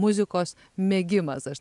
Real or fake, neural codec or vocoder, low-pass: real; none; 10.8 kHz